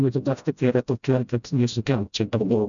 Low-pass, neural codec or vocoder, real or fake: 7.2 kHz; codec, 16 kHz, 0.5 kbps, FreqCodec, smaller model; fake